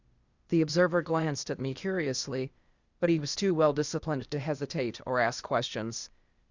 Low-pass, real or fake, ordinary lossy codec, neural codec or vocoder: 7.2 kHz; fake; Opus, 64 kbps; codec, 16 kHz, 0.8 kbps, ZipCodec